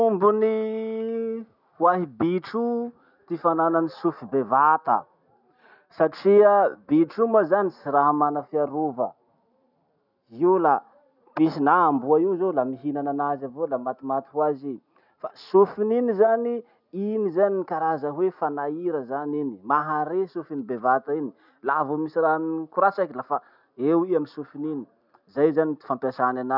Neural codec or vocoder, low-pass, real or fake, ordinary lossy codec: none; 5.4 kHz; real; none